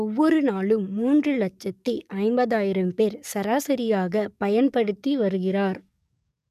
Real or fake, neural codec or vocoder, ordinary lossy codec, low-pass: fake; codec, 44.1 kHz, 7.8 kbps, DAC; none; 14.4 kHz